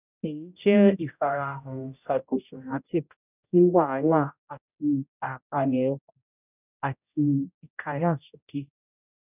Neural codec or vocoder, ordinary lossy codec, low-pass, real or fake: codec, 16 kHz, 0.5 kbps, X-Codec, HuBERT features, trained on general audio; none; 3.6 kHz; fake